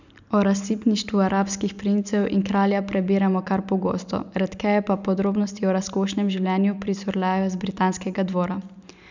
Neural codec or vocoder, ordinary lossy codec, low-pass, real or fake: none; none; 7.2 kHz; real